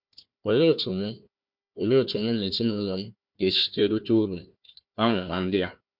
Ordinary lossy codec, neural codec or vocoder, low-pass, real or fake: MP3, 48 kbps; codec, 16 kHz, 1 kbps, FunCodec, trained on Chinese and English, 50 frames a second; 5.4 kHz; fake